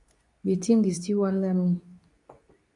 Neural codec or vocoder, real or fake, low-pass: codec, 24 kHz, 0.9 kbps, WavTokenizer, medium speech release version 2; fake; 10.8 kHz